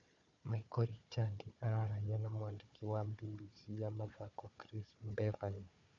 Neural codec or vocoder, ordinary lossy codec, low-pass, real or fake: codec, 16 kHz, 4 kbps, FunCodec, trained on Chinese and English, 50 frames a second; none; 7.2 kHz; fake